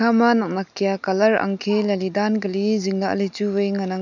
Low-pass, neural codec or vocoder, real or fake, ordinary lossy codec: 7.2 kHz; none; real; none